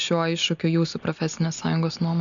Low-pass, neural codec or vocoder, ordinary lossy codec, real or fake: 7.2 kHz; none; MP3, 96 kbps; real